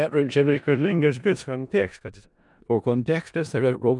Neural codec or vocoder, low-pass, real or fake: codec, 16 kHz in and 24 kHz out, 0.4 kbps, LongCat-Audio-Codec, four codebook decoder; 10.8 kHz; fake